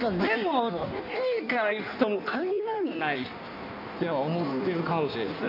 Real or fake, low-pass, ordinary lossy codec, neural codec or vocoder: fake; 5.4 kHz; none; codec, 16 kHz in and 24 kHz out, 1.1 kbps, FireRedTTS-2 codec